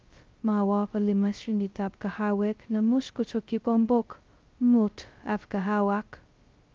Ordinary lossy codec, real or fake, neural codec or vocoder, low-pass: Opus, 24 kbps; fake; codec, 16 kHz, 0.2 kbps, FocalCodec; 7.2 kHz